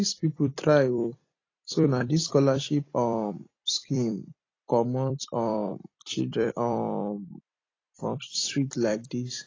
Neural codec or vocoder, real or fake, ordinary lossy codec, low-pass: vocoder, 44.1 kHz, 128 mel bands every 256 samples, BigVGAN v2; fake; AAC, 32 kbps; 7.2 kHz